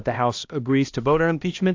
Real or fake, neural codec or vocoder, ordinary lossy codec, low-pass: fake; codec, 16 kHz, 1 kbps, X-Codec, HuBERT features, trained on balanced general audio; AAC, 48 kbps; 7.2 kHz